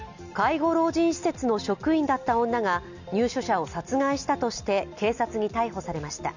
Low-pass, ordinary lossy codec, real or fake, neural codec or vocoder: 7.2 kHz; none; real; none